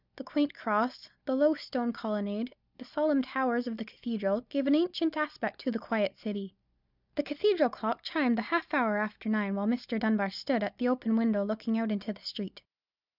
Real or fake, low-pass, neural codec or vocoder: real; 5.4 kHz; none